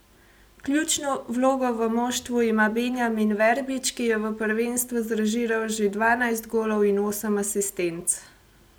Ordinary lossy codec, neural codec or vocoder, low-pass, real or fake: none; none; none; real